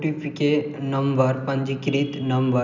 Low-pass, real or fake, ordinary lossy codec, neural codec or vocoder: 7.2 kHz; real; none; none